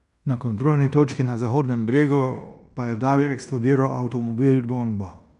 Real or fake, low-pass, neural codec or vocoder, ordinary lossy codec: fake; 10.8 kHz; codec, 16 kHz in and 24 kHz out, 0.9 kbps, LongCat-Audio-Codec, fine tuned four codebook decoder; none